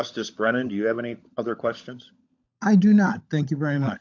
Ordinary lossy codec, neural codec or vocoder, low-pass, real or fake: AAC, 48 kbps; codec, 16 kHz, 16 kbps, FunCodec, trained on LibriTTS, 50 frames a second; 7.2 kHz; fake